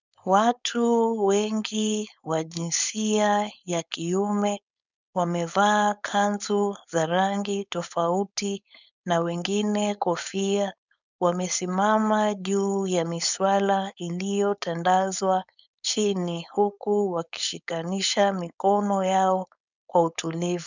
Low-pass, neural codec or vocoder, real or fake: 7.2 kHz; codec, 16 kHz, 4.8 kbps, FACodec; fake